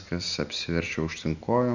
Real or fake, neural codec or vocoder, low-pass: real; none; 7.2 kHz